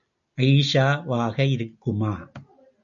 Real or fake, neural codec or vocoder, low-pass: real; none; 7.2 kHz